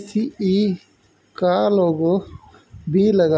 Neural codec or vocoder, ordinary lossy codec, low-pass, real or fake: none; none; none; real